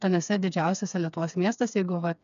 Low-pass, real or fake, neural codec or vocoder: 7.2 kHz; fake; codec, 16 kHz, 4 kbps, FreqCodec, smaller model